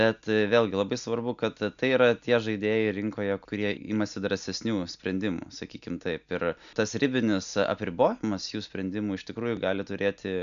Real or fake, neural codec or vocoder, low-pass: real; none; 7.2 kHz